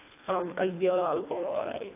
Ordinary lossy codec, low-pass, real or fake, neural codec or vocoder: AAC, 24 kbps; 3.6 kHz; fake; codec, 24 kHz, 1.5 kbps, HILCodec